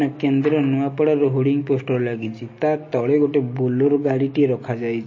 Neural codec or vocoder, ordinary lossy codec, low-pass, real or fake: none; MP3, 32 kbps; 7.2 kHz; real